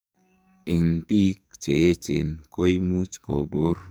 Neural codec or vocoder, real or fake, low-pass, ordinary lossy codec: codec, 44.1 kHz, 2.6 kbps, SNAC; fake; none; none